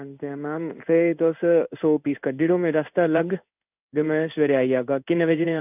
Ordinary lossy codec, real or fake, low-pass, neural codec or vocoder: none; fake; 3.6 kHz; codec, 16 kHz in and 24 kHz out, 1 kbps, XY-Tokenizer